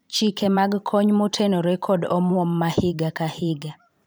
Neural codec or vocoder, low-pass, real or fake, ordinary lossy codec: vocoder, 44.1 kHz, 128 mel bands every 512 samples, BigVGAN v2; none; fake; none